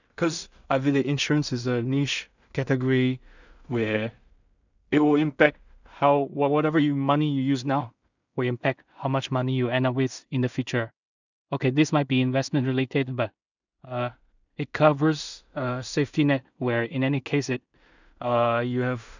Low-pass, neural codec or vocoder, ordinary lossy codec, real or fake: 7.2 kHz; codec, 16 kHz in and 24 kHz out, 0.4 kbps, LongCat-Audio-Codec, two codebook decoder; none; fake